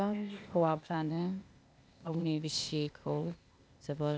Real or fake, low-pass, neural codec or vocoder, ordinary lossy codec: fake; none; codec, 16 kHz, 0.8 kbps, ZipCodec; none